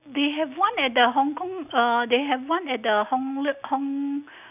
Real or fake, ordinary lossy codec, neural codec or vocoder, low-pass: real; none; none; 3.6 kHz